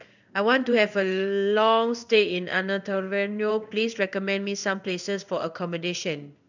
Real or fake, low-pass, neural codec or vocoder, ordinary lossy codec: fake; 7.2 kHz; codec, 16 kHz in and 24 kHz out, 1 kbps, XY-Tokenizer; none